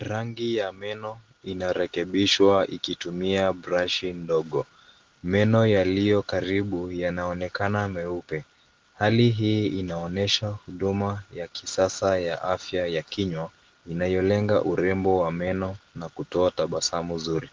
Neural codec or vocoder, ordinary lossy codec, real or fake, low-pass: none; Opus, 16 kbps; real; 7.2 kHz